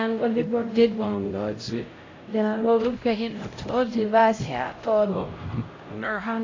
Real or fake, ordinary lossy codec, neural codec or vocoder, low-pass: fake; AAC, 32 kbps; codec, 16 kHz, 0.5 kbps, X-Codec, HuBERT features, trained on LibriSpeech; 7.2 kHz